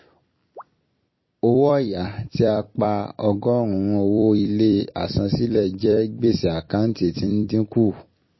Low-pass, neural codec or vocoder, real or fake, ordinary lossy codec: 7.2 kHz; vocoder, 44.1 kHz, 128 mel bands every 256 samples, BigVGAN v2; fake; MP3, 24 kbps